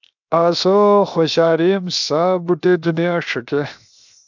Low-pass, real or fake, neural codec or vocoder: 7.2 kHz; fake; codec, 16 kHz, 0.7 kbps, FocalCodec